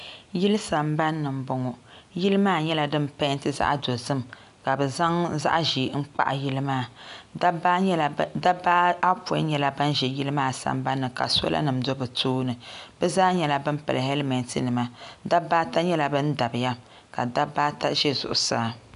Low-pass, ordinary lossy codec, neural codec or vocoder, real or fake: 10.8 kHz; AAC, 96 kbps; none; real